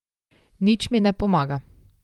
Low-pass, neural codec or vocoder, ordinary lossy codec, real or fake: 19.8 kHz; vocoder, 44.1 kHz, 128 mel bands, Pupu-Vocoder; Opus, 32 kbps; fake